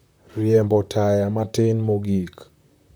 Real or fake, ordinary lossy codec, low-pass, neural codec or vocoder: fake; none; none; vocoder, 44.1 kHz, 128 mel bands, Pupu-Vocoder